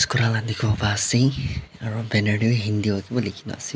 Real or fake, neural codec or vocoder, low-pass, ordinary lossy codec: real; none; none; none